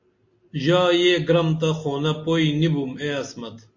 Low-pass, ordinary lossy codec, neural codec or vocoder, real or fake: 7.2 kHz; MP3, 48 kbps; none; real